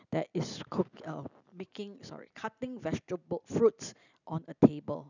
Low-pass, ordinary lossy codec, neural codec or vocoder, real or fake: 7.2 kHz; none; none; real